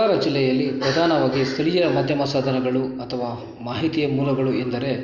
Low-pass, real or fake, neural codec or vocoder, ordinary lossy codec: 7.2 kHz; real; none; Opus, 64 kbps